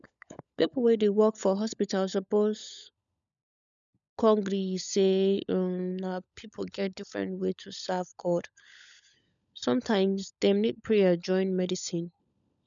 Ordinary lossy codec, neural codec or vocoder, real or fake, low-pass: none; codec, 16 kHz, 16 kbps, FunCodec, trained on LibriTTS, 50 frames a second; fake; 7.2 kHz